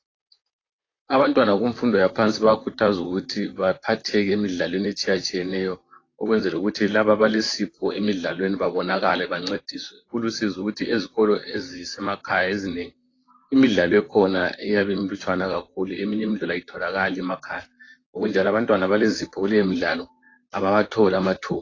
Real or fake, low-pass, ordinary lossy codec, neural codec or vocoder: fake; 7.2 kHz; AAC, 32 kbps; vocoder, 44.1 kHz, 128 mel bands, Pupu-Vocoder